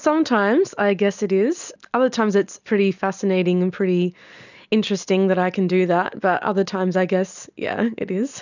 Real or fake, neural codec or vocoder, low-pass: real; none; 7.2 kHz